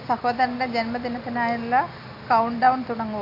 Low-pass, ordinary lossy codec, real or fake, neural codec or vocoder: 5.4 kHz; none; real; none